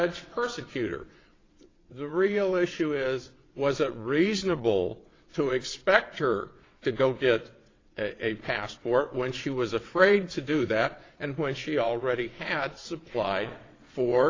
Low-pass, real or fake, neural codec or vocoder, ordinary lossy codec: 7.2 kHz; fake; vocoder, 22.05 kHz, 80 mel bands, WaveNeXt; AAC, 32 kbps